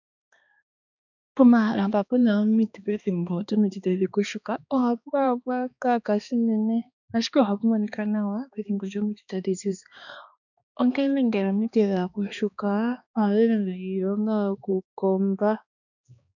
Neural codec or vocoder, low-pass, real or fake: codec, 16 kHz, 2 kbps, X-Codec, HuBERT features, trained on balanced general audio; 7.2 kHz; fake